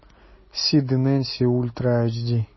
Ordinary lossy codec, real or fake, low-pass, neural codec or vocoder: MP3, 24 kbps; real; 7.2 kHz; none